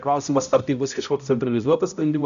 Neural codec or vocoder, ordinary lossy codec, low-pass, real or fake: codec, 16 kHz, 0.5 kbps, X-Codec, HuBERT features, trained on balanced general audio; MP3, 96 kbps; 7.2 kHz; fake